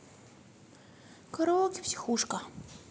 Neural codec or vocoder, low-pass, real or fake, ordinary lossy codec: none; none; real; none